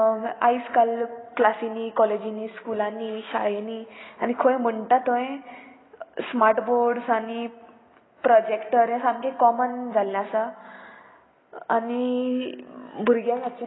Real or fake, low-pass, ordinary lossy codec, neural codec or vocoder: real; 7.2 kHz; AAC, 16 kbps; none